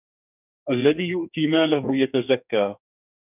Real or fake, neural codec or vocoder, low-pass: fake; codec, 44.1 kHz, 2.6 kbps, SNAC; 3.6 kHz